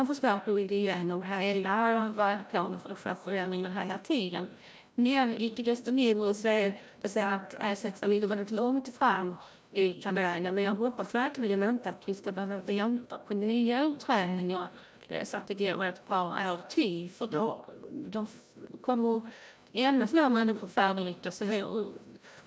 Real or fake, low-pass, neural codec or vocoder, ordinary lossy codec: fake; none; codec, 16 kHz, 0.5 kbps, FreqCodec, larger model; none